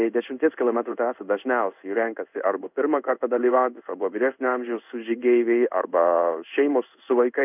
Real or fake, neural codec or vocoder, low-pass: fake; codec, 16 kHz in and 24 kHz out, 1 kbps, XY-Tokenizer; 3.6 kHz